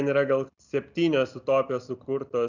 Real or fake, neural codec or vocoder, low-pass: real; none; 7.2 kHz